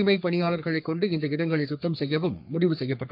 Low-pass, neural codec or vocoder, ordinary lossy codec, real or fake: 5.4 kHz; codec, 44.1 kHz, 3.4 kbps, Pupu-Codec; MP3, 48 kbps; fake